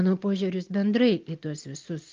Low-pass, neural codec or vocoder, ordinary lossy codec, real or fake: 7.2 kHz; none; Opus, 32 kbps; real